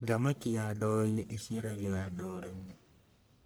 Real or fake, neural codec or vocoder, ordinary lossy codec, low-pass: fake; codec, 44.1 kHz, 1.7 kbps, Pupu-Codec; none; none